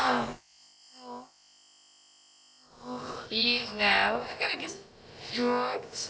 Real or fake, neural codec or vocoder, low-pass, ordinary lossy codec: fake; codec, 16 kHz, about 1 kbps, DyCAST, with the encoder's durations; none; none